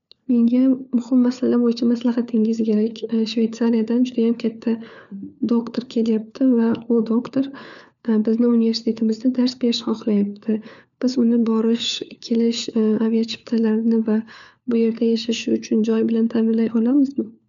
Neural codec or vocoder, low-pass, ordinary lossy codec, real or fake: codec, 16 kHz, 4 kbps, FunCodec, trained on LibriTTS, 50 frames a second; 7.2 kHz; none; fake